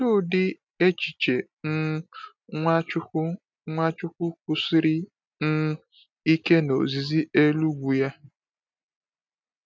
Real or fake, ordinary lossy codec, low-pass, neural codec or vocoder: real; none; none; none